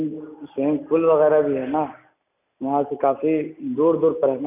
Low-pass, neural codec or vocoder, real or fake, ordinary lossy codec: 3.6 kHz; none; real; none